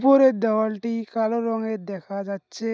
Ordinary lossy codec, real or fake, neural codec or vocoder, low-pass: none; real; none; none